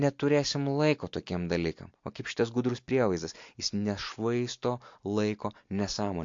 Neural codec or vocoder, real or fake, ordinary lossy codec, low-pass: none; real; MP3, 48 kbps; 7.2 kHz